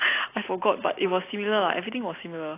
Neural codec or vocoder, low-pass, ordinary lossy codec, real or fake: none; 3.6 kHz; AAC, 24 kbps; real